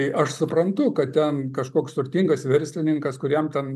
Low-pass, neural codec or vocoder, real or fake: 14.4 kHz; none; real